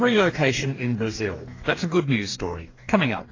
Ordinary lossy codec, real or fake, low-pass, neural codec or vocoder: AAC, 32 kbps; fake; 7.2 kHz; codec, 44.1 kHz, 2.6 kbps, DAC